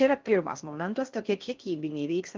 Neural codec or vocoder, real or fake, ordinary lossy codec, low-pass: codec, 16 kHz in and 24 kHz out, 0.6 kbps, FocalCodec, streaming, 4096 codes; fake; Opus, 24 kbps; 7.2 kHz